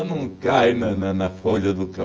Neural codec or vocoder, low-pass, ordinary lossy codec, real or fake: vocoder, 24 kHz, 100 mel bands, Vocos; 7.2 kHz; Opus, 24 kbps; fake